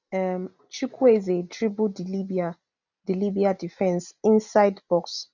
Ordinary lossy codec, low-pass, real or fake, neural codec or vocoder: none; 7.2 kHz; real; none